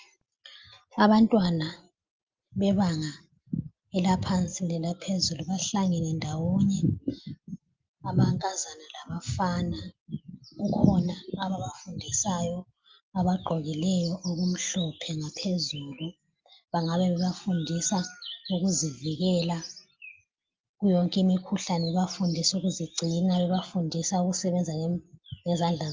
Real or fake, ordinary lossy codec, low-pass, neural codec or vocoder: real; Opus, 24 kbps; 7.2 kHz; none